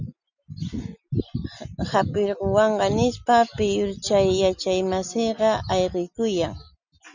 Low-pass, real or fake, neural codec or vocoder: 7.2 kHz; real; none